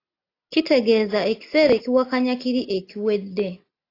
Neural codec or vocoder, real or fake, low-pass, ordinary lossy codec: none; real; 5.4 kHz; AAC, 32 kbps